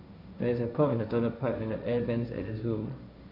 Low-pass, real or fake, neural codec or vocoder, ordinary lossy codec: 5.4 kHz; fake; codec, 16 kHz, 1.1 kbps, Voila-Tokenizer; none